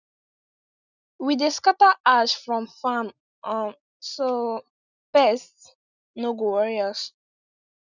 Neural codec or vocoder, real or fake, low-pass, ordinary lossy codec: none; real; 7.2 kHz; none